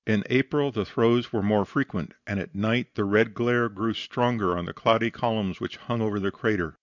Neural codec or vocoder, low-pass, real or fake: none; 7.2 kHz; real